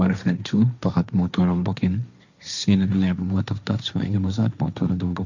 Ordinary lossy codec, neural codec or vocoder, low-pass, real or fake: none; codec, 16 kHz, 1.1 kbps, Voila-Tokenizer; 7.2 kHz; fake